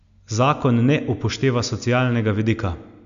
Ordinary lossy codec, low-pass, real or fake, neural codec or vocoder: none; 7.2 kHz; real; none